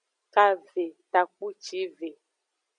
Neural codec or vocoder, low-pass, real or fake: none; 9.9 kHz; real